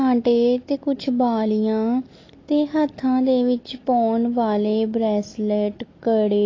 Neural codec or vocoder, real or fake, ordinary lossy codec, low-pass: none; real; AAC, 32 kbps; 7.2 kHz